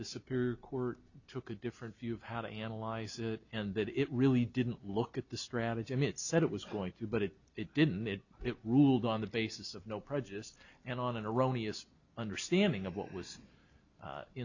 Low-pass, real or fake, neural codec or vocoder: 7.2 kHz; real; none